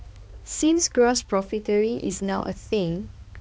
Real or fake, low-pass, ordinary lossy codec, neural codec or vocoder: fake; none; none; codec, 16 kHz, 2 kbps, X-Codec, HuBERT features, trained on balanced general audio